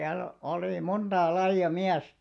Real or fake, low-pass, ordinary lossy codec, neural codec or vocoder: real; none; none; none